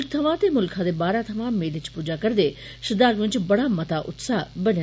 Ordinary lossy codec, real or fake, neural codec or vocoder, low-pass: none; real; none; none